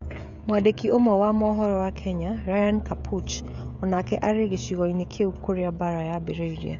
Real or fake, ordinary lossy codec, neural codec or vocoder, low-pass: fake; none; codec, 16 kHz, 16 kbps, FreqCodec, smaller model; 7.2 kHz